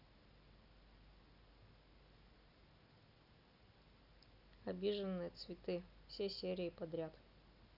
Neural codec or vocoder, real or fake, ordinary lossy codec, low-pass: none; real; none; 5.4 kHz